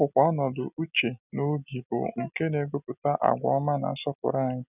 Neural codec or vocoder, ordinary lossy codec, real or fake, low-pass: none; none; real; 3.6 kHz